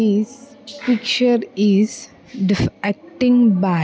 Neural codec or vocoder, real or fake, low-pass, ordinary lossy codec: none; real; none; none